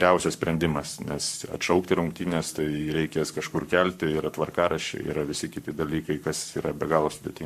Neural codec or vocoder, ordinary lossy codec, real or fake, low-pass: codec, 44.1 kHz, 7.8 kbps, Pupu-Codec; MP3, 96 kbps; fake; 14.4 kHz